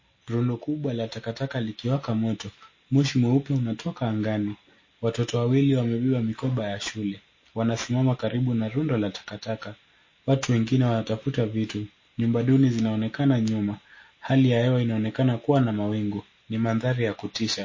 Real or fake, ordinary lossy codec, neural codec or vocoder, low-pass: real; MP3, 32 kbps; none; 7.2 kHz